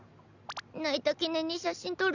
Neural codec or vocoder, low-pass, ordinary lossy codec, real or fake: none; 7.2 kHz; none; real